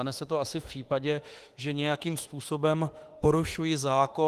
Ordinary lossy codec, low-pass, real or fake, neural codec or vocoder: Opus, 24 kbps; 14.4 kHz; fake; autoencoder, 48 kHz, 32 numbers a frame, DAC-VAE, trained on Japanese speech